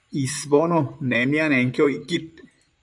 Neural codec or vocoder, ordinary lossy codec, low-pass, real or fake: vocoder, 44.1 kHz, 128 mel bands, Pupu-Vocoder; MP3, 96 kbps; 10.8 kHz; fake